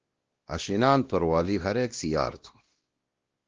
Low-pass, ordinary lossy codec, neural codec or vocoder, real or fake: 7.2 kHz; Opus, 16 kbps; codec, 16 kHz, 1 kbps, X-Codec, WavLM features, trained on Multilingual LibriSpeech; fake